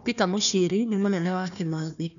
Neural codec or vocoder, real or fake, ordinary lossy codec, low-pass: codec, 16 kHz, 1 kbps, FunCodec, trained on Chinese and English, 50 frames a second; fake; none; 7.2 kHz